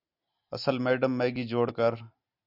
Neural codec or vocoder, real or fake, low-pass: none; real; 5.4 kHz